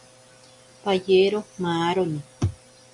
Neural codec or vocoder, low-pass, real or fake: none; 10.8 kHz; real